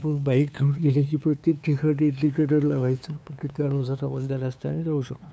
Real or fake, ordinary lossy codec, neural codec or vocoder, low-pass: fake; none; codec, 16 kHz, 2 kbps, FunCodec, trained on LibriTTS, 25 frames a second; none